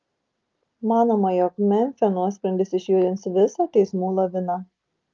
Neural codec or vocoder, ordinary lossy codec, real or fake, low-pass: none; Opus, 32 kbps; real; 7.2 kHz